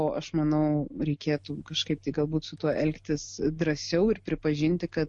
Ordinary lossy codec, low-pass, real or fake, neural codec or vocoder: MP3, 48 kbps; 10.8 kHz; real; none